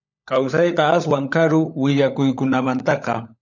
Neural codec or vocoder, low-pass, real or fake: codec, 16 kHz, 16 kbps, FunCodec, trained on LibriTTS, 50 frames a second; 7.2 kHz; fake